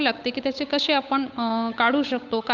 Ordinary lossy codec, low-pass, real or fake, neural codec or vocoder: none; 7.2 kHz; fake; codec, 16 kHz, 8 kbps, FunCodec, trained on Chinese and English, 25 frames a second